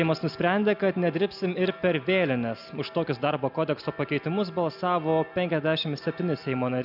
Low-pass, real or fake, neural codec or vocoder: 5.4 kHz; real; none